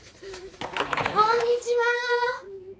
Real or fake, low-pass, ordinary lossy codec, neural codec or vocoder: fake; none; none; codec, 16 kHz, 4 kbps, X-Codec, HuBERT features, trained on balanced general audio